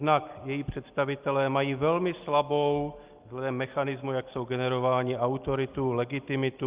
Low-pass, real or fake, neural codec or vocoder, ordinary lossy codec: 3.6 kHz; real; none; Opus, 24 kbps